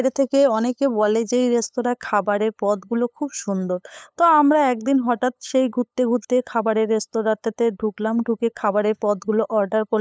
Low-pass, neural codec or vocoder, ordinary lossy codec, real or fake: none; codec, 16 kHz, 8 kbps, FunCodec, trained on LibriTTS, 25 frames a second; none; fake